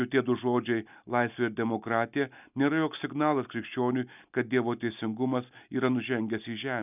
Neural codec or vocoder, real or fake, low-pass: none; real; 3.6 kHz